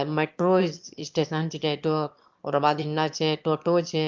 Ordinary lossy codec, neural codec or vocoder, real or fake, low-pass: Opus, 32 kbps; autoencoder, 22.05 kHz, a latent of 192 numbers a frame, VITS, trained on one speaker; fake; 7.2 kHz